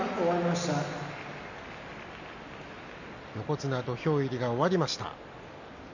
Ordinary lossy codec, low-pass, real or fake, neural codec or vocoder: none; 7.2 kHz; real; none